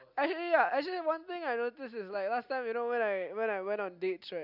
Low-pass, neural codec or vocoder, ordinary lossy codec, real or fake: 5.4 kHz; none; none; real